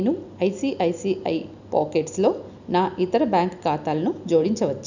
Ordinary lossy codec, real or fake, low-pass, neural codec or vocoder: none; real; 7.2 kHz; none